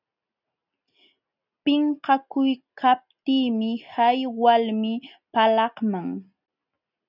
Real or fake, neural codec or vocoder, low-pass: real; none; 5.4 kHz